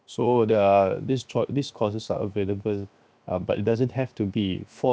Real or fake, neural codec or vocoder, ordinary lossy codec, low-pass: fake; codec, 16 kHz, 0.7 kbps, FocalCodec; none; none